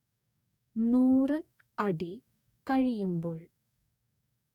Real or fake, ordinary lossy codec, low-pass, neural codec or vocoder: fake; none; 19.8 kHz; codec, 44.1 kHz, 2.6 kbps, DAC